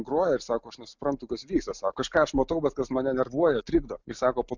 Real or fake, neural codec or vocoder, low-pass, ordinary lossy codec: real; none; 7.2 kHz; Opus, 64 kbps